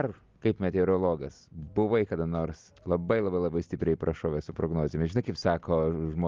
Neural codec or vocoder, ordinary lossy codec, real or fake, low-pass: none; Opus, 32 kbps; real; 7.2 kHz